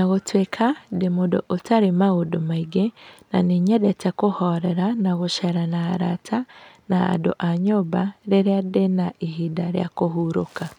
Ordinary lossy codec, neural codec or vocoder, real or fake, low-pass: none; none; real; 19.8 kHz